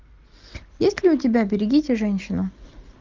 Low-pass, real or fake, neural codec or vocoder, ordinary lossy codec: 7.2 kHz; real; none; Opus, 24 kbps